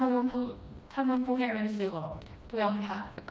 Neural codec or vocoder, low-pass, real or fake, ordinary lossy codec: codec, 16 kHz, 1 kbps, FreqCodec, smaller model; none; fake; none